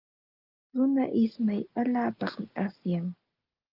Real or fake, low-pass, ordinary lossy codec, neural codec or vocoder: real; 5.4 kHz; Opus, 32 kbps; none